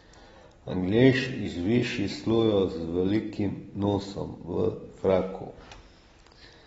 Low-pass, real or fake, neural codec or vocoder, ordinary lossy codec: 19.8 kHz; real; none; AAC, 24 kbps